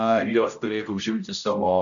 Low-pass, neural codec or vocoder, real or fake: 7.2 kHz; codec, 16 kHz, 0.5 kbps, X-Codec, HuBERT features, trained on general audio; fake